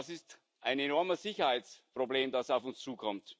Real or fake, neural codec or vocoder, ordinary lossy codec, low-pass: real; none; none; none